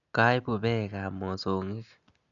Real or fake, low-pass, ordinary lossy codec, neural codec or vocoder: real; 7.2 kHz; none; none